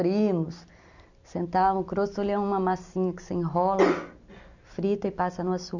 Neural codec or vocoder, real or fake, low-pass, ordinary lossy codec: none; real; 7.2 kHz; none